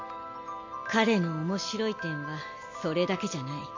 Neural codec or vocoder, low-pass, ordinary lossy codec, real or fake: none; 7.2 kHz; AAC, 48 kbps; real